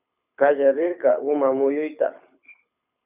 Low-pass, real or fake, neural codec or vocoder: 3.6 kHz; fake; codec, 24 kHz, 6 kbps, HILCodec